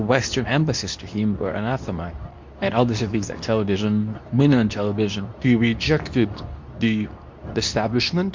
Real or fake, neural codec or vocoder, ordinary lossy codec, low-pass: fake; codec, 24 kHz, 0.9 kbps, WavTokenizer, medium speech release version 2; MP3, 48 kbps; 7.2 kHz